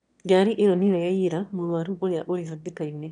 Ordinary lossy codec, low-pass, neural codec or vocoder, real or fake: none; 9.9 kHz; autoencoder, 22.05 kHz, a latent of 192 numbers a frame, VITS, trained on one speaker; fake